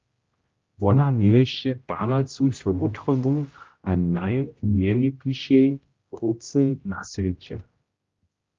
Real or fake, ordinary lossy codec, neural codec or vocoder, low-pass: fake; Opus, 32 kbps; codec, 16 kHz, 0.5 kbps, X-Codec, HuBERT features, trained on general audio; 7.2 kHz